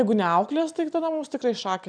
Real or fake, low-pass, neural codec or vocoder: real; 9.9 kHz; none